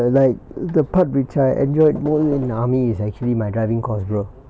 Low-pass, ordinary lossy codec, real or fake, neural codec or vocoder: none; none; real; none